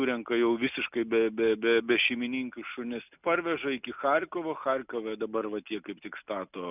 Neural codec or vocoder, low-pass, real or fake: none; 3.6 kHz; real